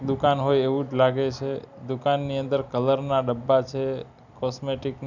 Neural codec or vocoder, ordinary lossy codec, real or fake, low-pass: none; none; real; 7.2 kHz